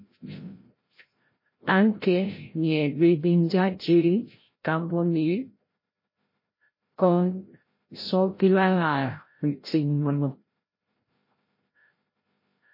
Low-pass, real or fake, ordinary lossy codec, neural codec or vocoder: 5.4 kHz; fake; MP3, 24 kbps; codec, 16 kHz, 0.5 kbps, FreqCodec, larger model